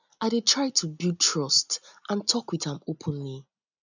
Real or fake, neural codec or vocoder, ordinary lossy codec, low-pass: real; none; none; 7.2 kHz